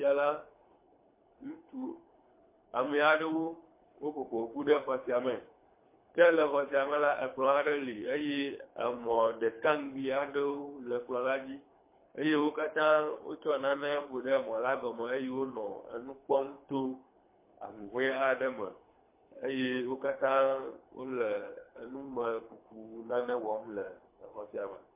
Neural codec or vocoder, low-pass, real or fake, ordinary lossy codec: codec, 24 kHz, 3 kbps, HILCodec; 3.6 kHz; fake; MP3, 24 kbps